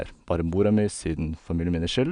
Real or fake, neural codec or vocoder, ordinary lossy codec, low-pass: real; none; none; 9.9 kHz